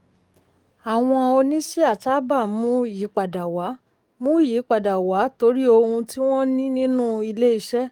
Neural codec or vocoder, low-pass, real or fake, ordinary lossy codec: codec, 44.1 kHz, 7.8 kbps, DAC; 19.8 kHz; fake; Opus, 32 kbps